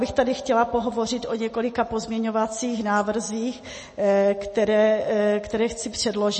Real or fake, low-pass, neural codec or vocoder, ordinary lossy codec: real; 10.8 kHz; none; MP3, 32 kbps